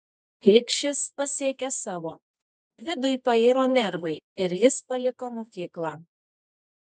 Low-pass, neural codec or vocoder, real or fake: 10.8 kHz; codec, 24 kHz, 0.9 kbps, WavTokenizer, medium music audio release; fake